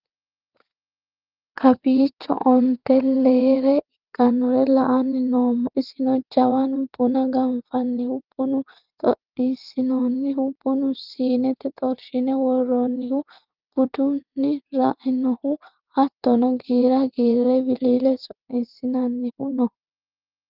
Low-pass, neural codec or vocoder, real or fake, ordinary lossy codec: 5.4 kHz; vocoder, 22.05 kHz, 80 mel bands, WaveNeXt; fake; Opus, 32 kbps